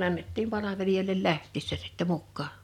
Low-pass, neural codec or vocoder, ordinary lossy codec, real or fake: 19.8 kHz; none; none; real